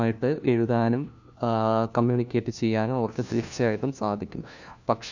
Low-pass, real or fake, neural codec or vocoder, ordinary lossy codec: 7.2 kHz; fake; codec, 16 kHz, 1 kbps, FunCodec, trained on LibriTTS, 50 frames a second; none